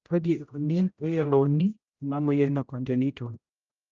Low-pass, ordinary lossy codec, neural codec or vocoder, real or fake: 7.2 kHz; Opus, 24 kbps; codec, 16 kHz, 0.5 kbps, X-Codec, HuBERT features, trained on general audio; fake